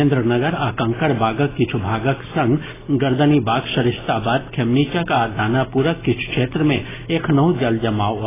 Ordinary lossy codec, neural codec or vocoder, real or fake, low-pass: AAC, 16 kbps; none; real; 3.6 kHz